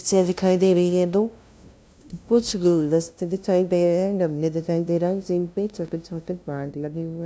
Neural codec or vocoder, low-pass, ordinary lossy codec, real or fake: codec, 16 kHz, 0.5 kbps, FunCodec, trained on LibriTTS, 25 frames a second; none; none; fake